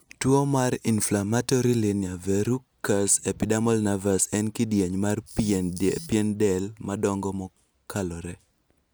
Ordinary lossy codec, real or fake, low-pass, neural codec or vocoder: none; real; none; none